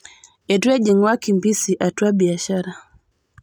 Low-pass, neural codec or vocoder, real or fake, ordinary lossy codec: 19.8 kHz; none; real; none